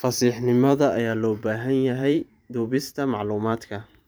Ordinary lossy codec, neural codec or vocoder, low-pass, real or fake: none; vocoder, 44.1 kHz, 128 mel bands every 512 samples, BigVGAN v2; none; fake